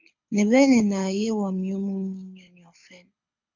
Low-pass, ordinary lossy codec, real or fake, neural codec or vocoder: 7.2 kHz; MP3, 64 kbps; fake; codec, 24 kHz, 6 kbps, HILCodec